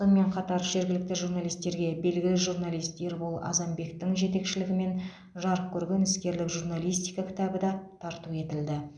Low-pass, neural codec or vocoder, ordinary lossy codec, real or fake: 9.9 kHz; none; none; real